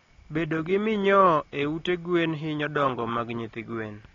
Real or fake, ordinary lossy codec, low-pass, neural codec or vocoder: real; AAC, 32 kbps; 7.2 kHz; none